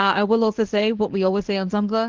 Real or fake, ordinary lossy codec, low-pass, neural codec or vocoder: fake; Opus, 16 kbps; 7.2 kHz; codec, 24 kHz, 0.9 kbps, WavTokenizer, medium speech release version 1